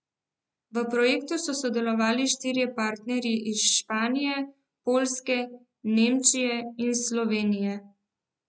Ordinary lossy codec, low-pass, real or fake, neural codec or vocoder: none; none; real; none